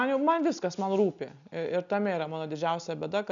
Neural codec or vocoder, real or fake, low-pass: none; real; 7.2 kHz